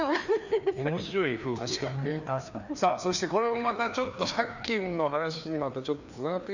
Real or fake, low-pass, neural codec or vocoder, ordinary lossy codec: fake; 7.2 kHz; codec, 16 kHz, 2 kbps, FreqCodec, larger model; none